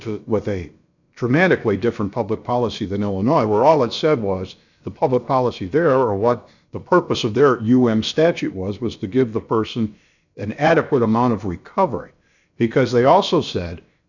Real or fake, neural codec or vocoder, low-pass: fake; codec, 16 kHz, about 1 kbps, DyCAST, with the encoder's durations; 7.2 kHz